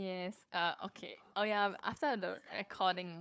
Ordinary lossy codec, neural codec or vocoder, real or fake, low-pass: none; codec, 16 kHz, 4 kbps, FunCodec, trained on Chinese and English, 50 frames a second; fake; none